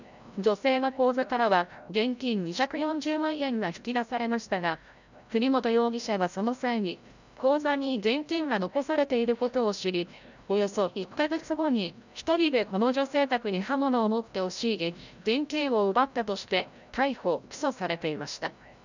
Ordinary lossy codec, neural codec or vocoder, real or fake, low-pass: none; codec, 16 kHz, 0.5 kbps, FreqCodec, larger model; fake; 7.2 kHz